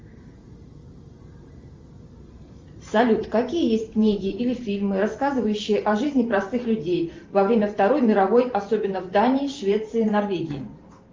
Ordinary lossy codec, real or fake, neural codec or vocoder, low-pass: Opus, 32 kbps; fake; vocoder, 44.1 kHz, 128 mel bands every 512 samples, BigVGAN v2; 7.2 kHz